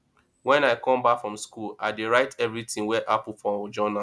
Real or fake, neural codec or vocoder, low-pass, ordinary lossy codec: real; none; none; none